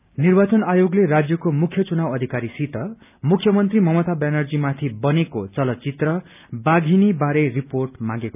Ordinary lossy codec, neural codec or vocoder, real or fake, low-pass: none; none; real; 3.6 kHz